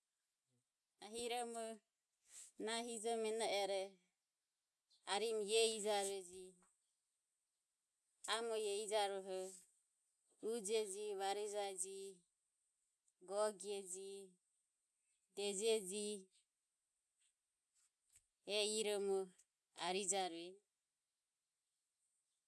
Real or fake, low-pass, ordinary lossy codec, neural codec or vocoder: real; none; none; none